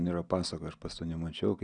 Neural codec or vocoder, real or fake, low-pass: none; real; 9.9 kHz